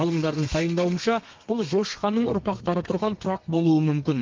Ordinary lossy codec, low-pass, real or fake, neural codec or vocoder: Opus, 32 kbps; 7.2 kHz; fake; codec, 32 kHz, 1.9 kbps, SNAC